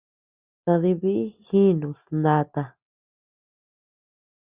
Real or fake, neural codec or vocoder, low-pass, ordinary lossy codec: real; none; 3.6 kHz; Opus, 64 kbps